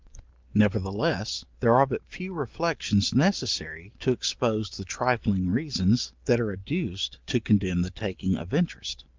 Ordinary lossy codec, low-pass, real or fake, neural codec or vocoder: Opus, 32 kbps; 7.2 kHz; real; none